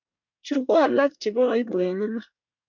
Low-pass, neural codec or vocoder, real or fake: 7.2 kHz; codec, 24 kHz, 1 kbps, SNAC; fake